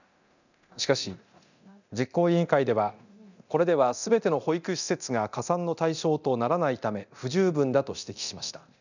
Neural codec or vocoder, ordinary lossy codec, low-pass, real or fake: codec, 24 kHz, 0.9 kbps, DualCodec; none; 7.2 kHz; fake